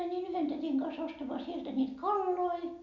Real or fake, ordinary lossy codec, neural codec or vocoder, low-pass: real; none; none; 7.2 kHz